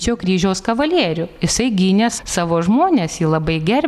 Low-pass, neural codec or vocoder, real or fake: 14.4 kHz; none; real